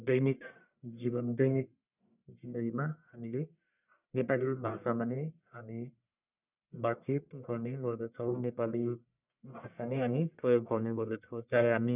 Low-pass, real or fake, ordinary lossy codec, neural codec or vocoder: 3.6 kHz; fake; none; codec, 44.1 kHz, 1.7 kbps, Pupu-Codec